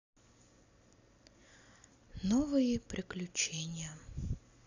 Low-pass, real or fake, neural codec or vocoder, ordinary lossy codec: 7.2 kHz; real; none; none